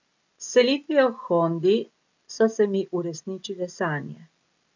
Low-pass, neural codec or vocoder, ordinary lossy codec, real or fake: 7.2 kHz; none; MP3, 48 kbps; real